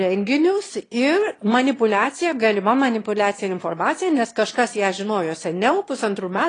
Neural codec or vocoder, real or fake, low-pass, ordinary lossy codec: autoencoder, 22.05 kHz, a latent of 192 numbers a frame, VITS, trained on one speaker; fake; 9.9 kHz; AAC, 32 kbps